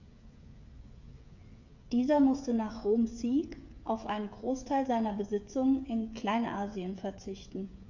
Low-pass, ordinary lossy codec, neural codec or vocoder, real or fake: 7.2 kHz; none; codec, 16 kHz, 8 kbps, FreqCodec, smaller model; fake